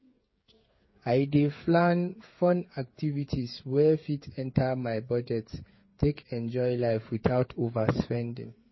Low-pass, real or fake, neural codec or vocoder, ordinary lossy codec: 7.2 kHz; fake; codec, 16 kHz, 8 kbps, FreqCodec, smaller model; MP3, 24 kbps